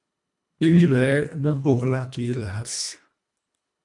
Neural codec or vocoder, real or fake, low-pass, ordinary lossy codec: codec, 24 kHz, 1.5 kbps, HILCodec; fake; 10.8 kHz; MP3, 64 kbps